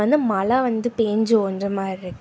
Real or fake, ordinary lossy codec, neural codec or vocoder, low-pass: real; none; none; none